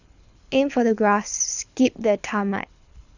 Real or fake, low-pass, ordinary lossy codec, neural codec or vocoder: fake; 7.2 kHz; none; codec, 24 kHz, 6 kbps, HILCodec